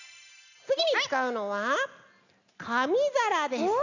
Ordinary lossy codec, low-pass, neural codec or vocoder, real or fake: none; 7.2 kHz; none; real